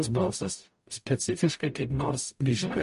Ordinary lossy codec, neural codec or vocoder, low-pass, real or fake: MP3, 48 kbps; codec, 44.1 kHz, 0.9 kbps, DAC; 14.4 kHz; fake